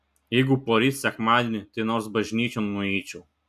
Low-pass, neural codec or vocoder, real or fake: 14.4 kHz; none; real